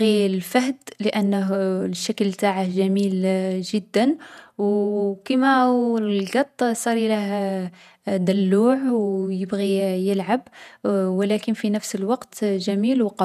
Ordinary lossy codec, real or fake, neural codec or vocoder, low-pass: none; fake; vocoder, 48 kHz, 128 mel bands, Vocos; 14.4 kHz